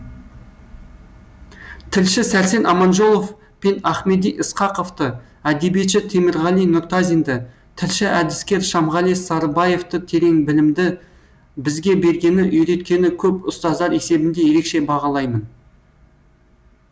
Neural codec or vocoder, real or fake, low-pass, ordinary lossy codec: none; real; none; none